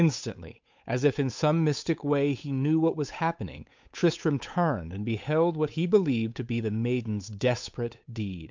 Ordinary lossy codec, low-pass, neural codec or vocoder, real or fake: MP3, 64 kbps; 7.2 kHz; codec, 16 kHz, 8 kbps, FunCodec, trained on Chinese and English, 25 frames a second; fake